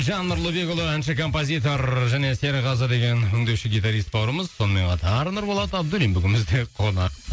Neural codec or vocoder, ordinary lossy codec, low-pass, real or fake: none; none; none; real